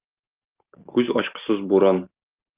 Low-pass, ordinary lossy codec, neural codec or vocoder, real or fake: 3.6 kHz; Opus, 32 kbps; none; real